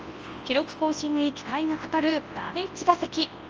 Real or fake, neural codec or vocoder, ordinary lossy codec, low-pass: fake; codec, 24 kHz, 0.9 kbps, WavTokenizer, large speech release; Opus, 24 kbps; 7.2 kHz